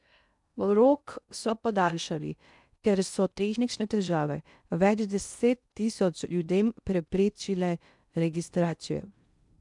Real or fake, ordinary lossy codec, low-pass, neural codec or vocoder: fake; none; 10.8 kHz; codec, 16 kHz in and 24 kHz out, 0.6 kbps, FocalCodec, streaming, 2048 codes